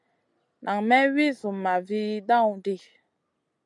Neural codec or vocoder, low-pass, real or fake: none; 10.8 kHz; real